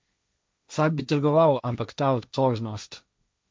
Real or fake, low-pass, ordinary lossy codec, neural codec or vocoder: fake; none; none; codec, 16 kHz, 1.1 kbps, Voila-Tokenizer